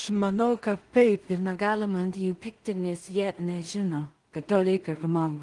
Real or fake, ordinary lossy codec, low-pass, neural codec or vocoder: fake; Opus, 24 kbps; 10.8 kHz; codec, 16 kHz in and 24 kHz out, 0.4 kbps, LongCat-Audio-Codec, two codebook decoder